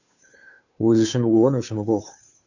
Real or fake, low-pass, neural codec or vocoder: fake; 7.2 kHz; codec, 16 kHz, 2 kbps, FunCodec, trained on Chinese and English, 25 frames a second